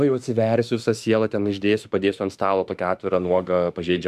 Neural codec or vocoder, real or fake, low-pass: autoencoder, 48 kHz, 32 numbers a frame, DAC-VAE, trained on Japanese speech; fake; 14.4 kHz